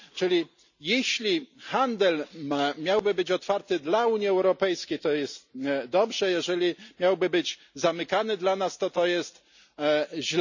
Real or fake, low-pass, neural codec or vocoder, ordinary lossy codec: real; 7.2 kHz; none; none